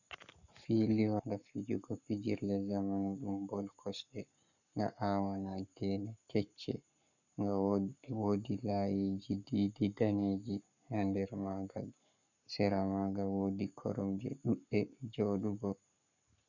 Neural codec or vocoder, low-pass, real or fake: codec, 44.1 kHz, 7.8 kbps, Pupu-Codec; 7.2 kHz; fake